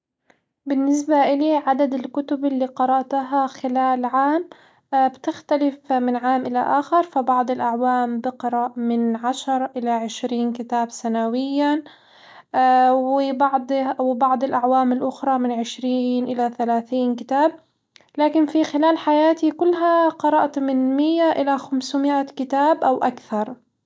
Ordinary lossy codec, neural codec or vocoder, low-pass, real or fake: none; none; none; real